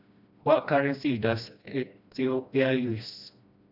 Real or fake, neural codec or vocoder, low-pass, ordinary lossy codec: fake; codec, 16 kHz, 1 kbps, FreqCodec, smaller model; 5.4 kHz; none